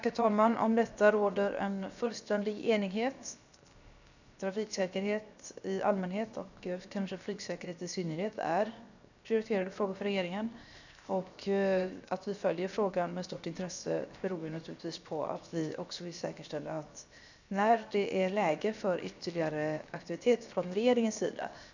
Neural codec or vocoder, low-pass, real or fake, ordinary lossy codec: codec, 16 kHz, 0.7 kbps, FocalCodec; 7.2 kHz; fake; none